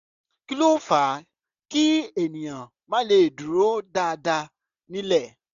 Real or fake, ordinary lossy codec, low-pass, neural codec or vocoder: real; none; 7.2 kHz; none